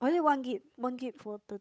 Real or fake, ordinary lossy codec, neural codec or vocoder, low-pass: fake; none; codec, 16 kHz, 8 kbps, FunCodec, trained on Chinese and English, 25 frames a second; none